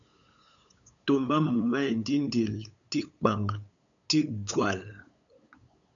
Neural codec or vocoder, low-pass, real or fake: codec, 16 kHz, 8 kbps, FunCodec, trained on LibriTTS, 25 frames a second; 7.2 kHz; fake